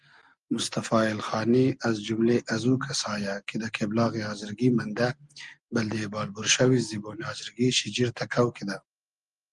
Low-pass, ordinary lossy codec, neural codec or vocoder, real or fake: 10.8 kHz; Opus, 24 kbps; none; real